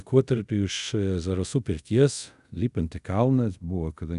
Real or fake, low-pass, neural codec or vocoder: fake; 10.8 kHz; codec, 24 kHz, 0.5 kbps, DualCodec